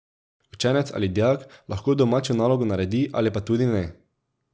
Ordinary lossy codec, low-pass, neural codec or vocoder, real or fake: none; none; none; real